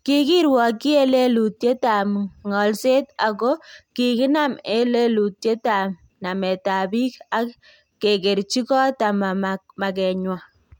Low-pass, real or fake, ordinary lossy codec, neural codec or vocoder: 19.8 kHz; real; MP3, 96 kbps; none